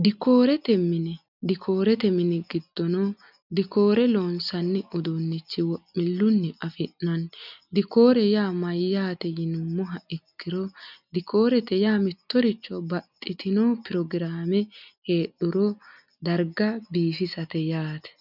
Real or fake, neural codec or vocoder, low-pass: real; none; 5.4 kHz